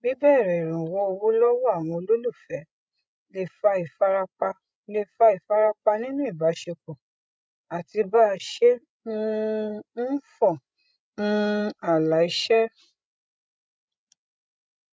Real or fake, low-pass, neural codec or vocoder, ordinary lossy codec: fake; none; codec, 16 kHz, 16 kbps, FreqCodec, larger model; none